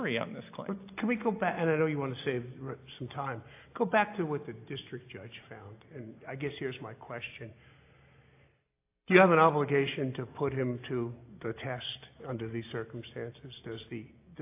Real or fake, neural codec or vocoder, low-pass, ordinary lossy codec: real; none; 3.6 kHz; AAC, 24 kbps